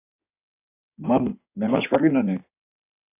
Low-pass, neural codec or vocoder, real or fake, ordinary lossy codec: 3.6 kHz; codec, 16 kHz in and 24 kHz out, 2.2 kbps, FireRedTTS-2 codec; fake; AAC, 24 kbps